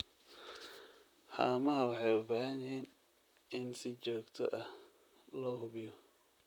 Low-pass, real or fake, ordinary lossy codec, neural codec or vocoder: 19.8 kHz; fake; none; vocoder, 44.1 kHz, 128 mel bands, Pupu-Vocoder